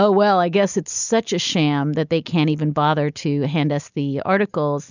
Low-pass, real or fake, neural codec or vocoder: 7.2 kHz; real; none